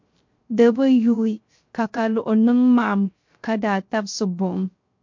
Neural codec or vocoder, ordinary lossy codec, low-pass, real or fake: codec, 16 kHz, 0.3 kbps, FocalCodec; MP3, 48 kbps; 7.2 kHz; fake